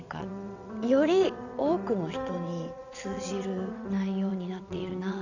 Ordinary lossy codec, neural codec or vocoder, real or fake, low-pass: none; vocoder, 22.05 kHz, 80 mel bands, WaveNeXt; fake; 7.2 kHz